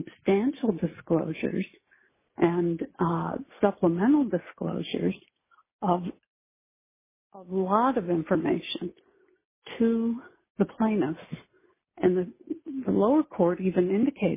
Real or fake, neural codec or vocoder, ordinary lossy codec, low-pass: real; none; MP3, 16 kbps; 3.6 kHz